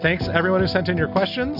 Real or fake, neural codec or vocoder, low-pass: real; none; 5.4 kHz